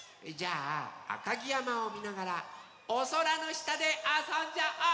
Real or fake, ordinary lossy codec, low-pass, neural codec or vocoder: real; none; none; none